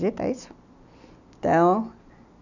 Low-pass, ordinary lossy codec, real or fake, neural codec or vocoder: 7.2 kHz; none; real; none